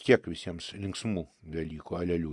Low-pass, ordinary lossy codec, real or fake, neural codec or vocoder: 10.8 kHz; Opus, 64 kbps; real; none